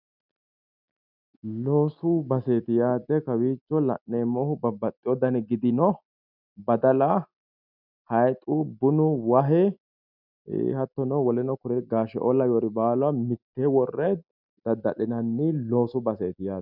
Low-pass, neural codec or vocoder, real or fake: 5.4 kHz; none; real